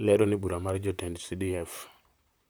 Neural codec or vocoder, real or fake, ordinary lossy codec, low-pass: vocoder, 44.1 kHz, 128 mel bands, Pupu-Vocoder; fake; none; none